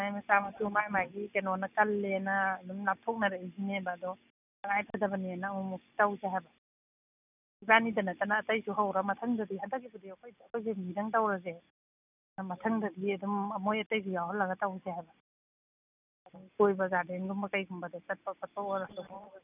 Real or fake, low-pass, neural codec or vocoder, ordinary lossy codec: real; 3.6 kHz; none; none